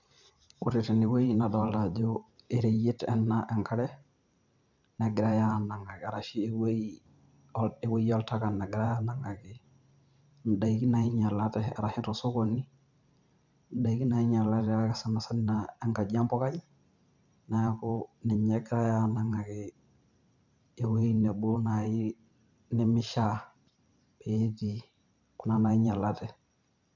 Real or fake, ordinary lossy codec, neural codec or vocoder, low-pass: fake; none; vocoder, 44.1 kHz, 128 mel bands every 512 samples, BigVGAN v2; 7.2 kHz